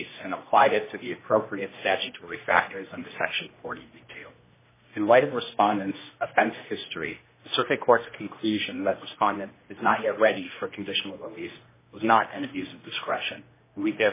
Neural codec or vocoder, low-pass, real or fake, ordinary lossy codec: codec, 16 kHz, 1 kbps, X-Codec, HuBERT features, trained on general audio; 3.6 kHz; fake; MP3, 16 kbps